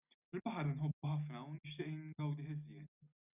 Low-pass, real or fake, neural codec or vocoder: 3.6 kHz; real; none